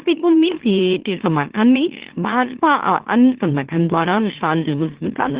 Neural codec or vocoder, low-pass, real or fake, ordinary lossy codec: autoencoder, 44.1 kHz, a latent of 192 numbers a frame, MeloTTS; 3.6 kHz; fake; Opus, 16 kbps